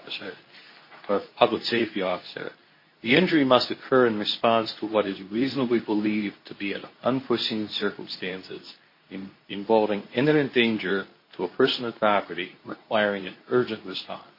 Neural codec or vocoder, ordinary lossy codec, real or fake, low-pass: codec, 24 kHz, 0.9 kbps, WavTokenizer, medium speech release version 2; MP3, 24 kbps; fake; 5.4 kHz